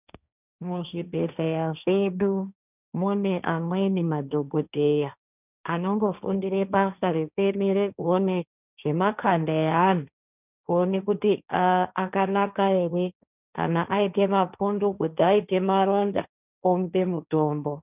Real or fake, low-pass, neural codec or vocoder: fake; 3.6 kHz; codec, 16 kHz, 1.1 kbps, Voila-Tokenizer